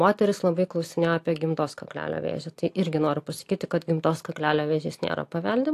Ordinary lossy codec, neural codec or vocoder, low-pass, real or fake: AAC, 64 kbps; none; 14.4 kHz; real